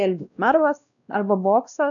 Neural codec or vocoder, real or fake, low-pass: codec, 16 kHz, 1 kbps, X-Codec, WavLM features, trained on Multilingual LibriSpeech; fake; 7.2 kHz